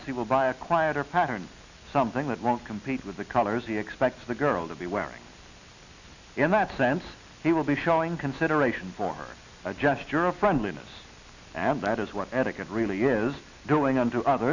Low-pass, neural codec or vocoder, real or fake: 7.2 kHz; none; real